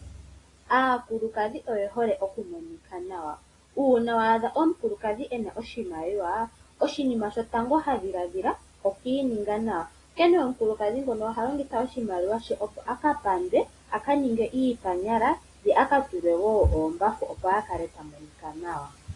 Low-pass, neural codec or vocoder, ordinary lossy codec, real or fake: 10.8 kHz; none; AAC, 32 kbps; real